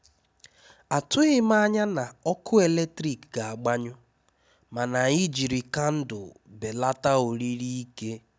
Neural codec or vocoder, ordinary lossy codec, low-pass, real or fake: none; none; none; real